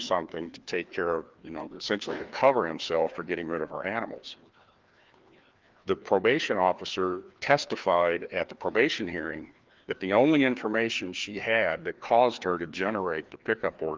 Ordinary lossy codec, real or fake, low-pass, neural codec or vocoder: Opus, 32 kbps; fake; 7.2 kHz; codec, 16 kHz, 2 kbps, FreqCodec, larger model